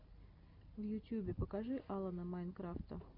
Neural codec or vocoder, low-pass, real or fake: none; 5.4 kHz; real